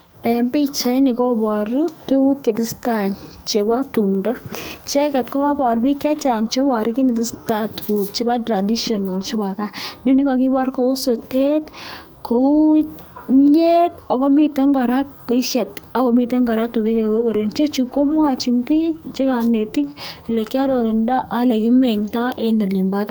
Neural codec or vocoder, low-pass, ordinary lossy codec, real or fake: codec, 44.1 kHz, 2.6 kbps, SNAC; none; none; fake